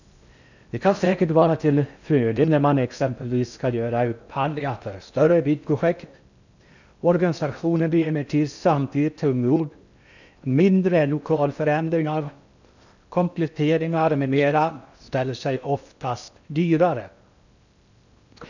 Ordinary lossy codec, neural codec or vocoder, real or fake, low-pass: none; codec, 16 kHz in and 24 kHz out, 0.6 kbps, FocalCodec, streaming, 4096 codes; fake; 7.2 kHz